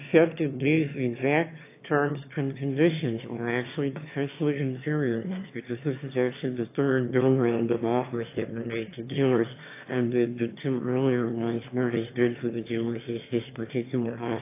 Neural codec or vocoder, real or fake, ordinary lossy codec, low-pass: autoencoder, 22.05 kHz, a latent of 192 numbers a frame, VITS, trained on one speaker; fake; AAC, 24 kbps; 3.6 kHz